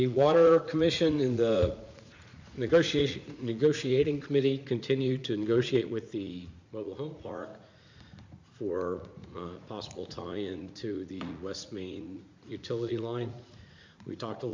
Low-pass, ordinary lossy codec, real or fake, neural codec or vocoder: 7.2 kHz; MP3, 64 kbps; fake; vocoder, 44.1 kHz, 80 mel bands, Vocos